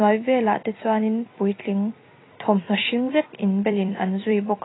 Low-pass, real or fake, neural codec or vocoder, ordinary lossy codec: 7.2 kHz; fake; vocoder, 44.1 kHz, 128 mel bands every 256 samples, BigVGAN v2; AAC, 16 kbps